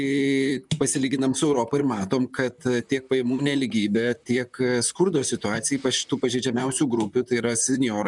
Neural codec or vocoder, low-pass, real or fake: vocoder, 44.1 kHz, 128 mel bands, Pupu-Vocoder; 10.8 kHz; fake